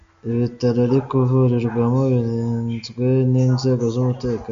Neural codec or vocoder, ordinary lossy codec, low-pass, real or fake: none; MP3, 64 kbps; 7.2 kHz; real